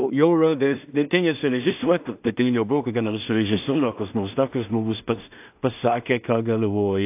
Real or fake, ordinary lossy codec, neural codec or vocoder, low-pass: fake; AAC, 32 kbps; codec, 16 kHz in and 24 kHz out, 0.4 kbps, LongCat-Audio-Codec, two codebook decoder; 3.6 kHz